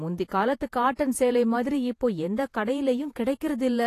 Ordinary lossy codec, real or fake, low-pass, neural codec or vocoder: AAC, 48 kbps; fake; 14.4 kHz; vocoder, 48 kHz, 128 mel bands, Vocos